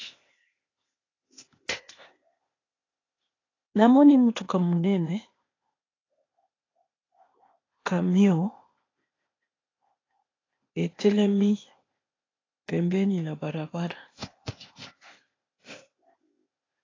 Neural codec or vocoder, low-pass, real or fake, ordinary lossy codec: codec, 16 kHz, 0.8 kbps, ZipCodec; 7.2 kHz; fake; AAC, 32 kbps